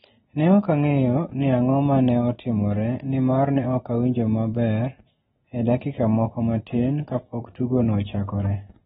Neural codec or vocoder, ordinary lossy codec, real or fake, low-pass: none; AAC, 16 kbps; real; 14.4 kHz